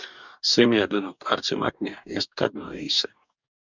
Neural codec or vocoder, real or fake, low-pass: codec, 44.1 kHz, 2.6 kbps, DAC; fake; 7.2 kHz